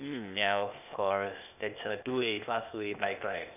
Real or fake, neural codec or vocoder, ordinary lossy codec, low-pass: fake; codec, 16 kHz, 0.8 kbps, ZipCodec; none; 3.6 kHz